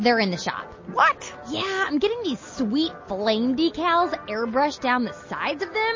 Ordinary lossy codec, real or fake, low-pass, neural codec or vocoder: MP3, 32 kbps; real; 7.2 kHz; none